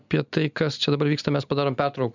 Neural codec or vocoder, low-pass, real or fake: none; 7.2 kHz; real